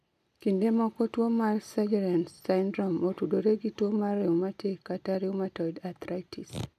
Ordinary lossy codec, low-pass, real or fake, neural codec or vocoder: none; 14.4 kHz; real; none